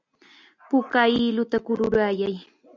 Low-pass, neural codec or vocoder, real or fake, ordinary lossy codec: 7.2 kHz; none; real; MP3, 64 kbps